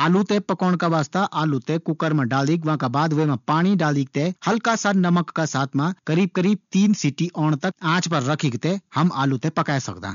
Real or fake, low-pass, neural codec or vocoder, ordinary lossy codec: real; 7.2 kHz; none; none